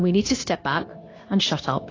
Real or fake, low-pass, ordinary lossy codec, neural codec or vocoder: fake; 7.2 kHz; AAC, 32 kbps; codec, 16 kHz, 0.9 kbps, LongCat-Audio-Codec